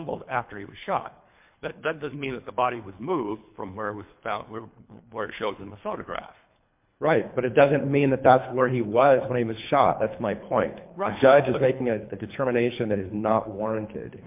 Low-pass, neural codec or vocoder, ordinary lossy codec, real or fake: 3.6 kHz; codec, 24 kHz, 3 kbps, HILCodec; MP3, 32 kbps; fake